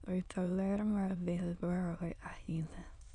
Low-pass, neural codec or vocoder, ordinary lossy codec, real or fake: 9.9 kHz; autoencoder, 22.05 kHz, a latent of 192 numbers a frame, VITS, trained on many speakers; none; fake